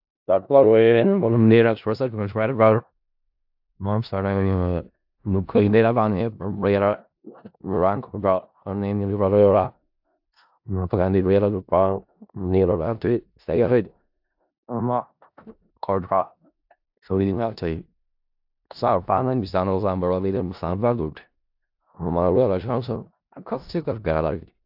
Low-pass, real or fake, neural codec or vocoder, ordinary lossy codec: 5.4 kHz; fake; codec, 16 kHz in and 24 kHz out, 0.4 kbps, LongCat-Audio-Codec, four codebook decoder; AAC, 48 kbps